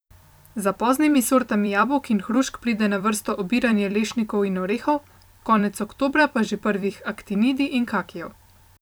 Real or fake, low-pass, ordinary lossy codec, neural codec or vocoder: fake; none; none; vocoder, 44.1 kHz, 128 mel bands every 256 samples, BigVGAN v2